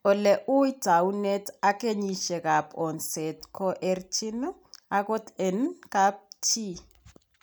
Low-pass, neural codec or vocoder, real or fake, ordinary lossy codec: none; none; real; none